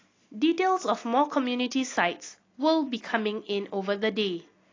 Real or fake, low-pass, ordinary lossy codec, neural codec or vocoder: real; 7.2 kHz; AAC, 32 kbps; none